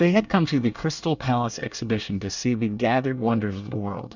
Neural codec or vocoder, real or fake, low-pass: codec, 24 kHz, 1 kbps, SNAC; fake; 7.2 kHz